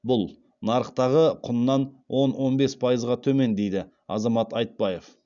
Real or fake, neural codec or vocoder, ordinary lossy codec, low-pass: real; none; MP3, 96 kbps; 7.2 kHz